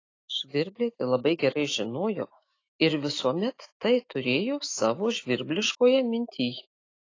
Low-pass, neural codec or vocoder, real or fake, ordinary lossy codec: 7.2 kHz; autoencoder, 48 kHz, 128 numbers a frame, DAC-VAE, trained on Japanese speech; fake; AAC, 32 kbps